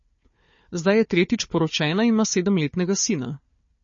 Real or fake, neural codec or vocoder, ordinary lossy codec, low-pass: fake; codec, 16 kHz, 4 kbps, FunCodec, trained on Chinese and English, 50 frames a second; MP3, 32 kbps; 7.2 kHz